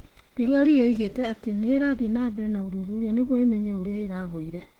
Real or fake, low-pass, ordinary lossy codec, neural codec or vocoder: fake; 19.8 kHz; Opus, 16 kbps; autoencoder, 48 kHz, 32 numbers a frame, DAC-VAE, trained on Japanese speech